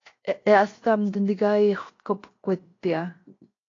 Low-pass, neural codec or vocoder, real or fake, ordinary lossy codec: 7.2 kHz; codec, 16 kHz, 0.7 kbps, FocalCodec; fake; AAC, 32 kbps